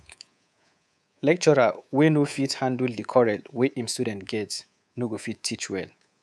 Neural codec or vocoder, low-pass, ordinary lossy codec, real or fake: codec, 24 kHz, 3.1 kbps, DualCodec; none; none; fake